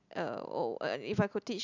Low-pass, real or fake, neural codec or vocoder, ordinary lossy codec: 7.2 kHz; real; none; none